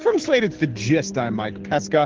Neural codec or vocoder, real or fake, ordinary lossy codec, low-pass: codec, 24 kHz, 6 kbps, HILCodec; fake; Opus, 16 kbps; 7.2 kHz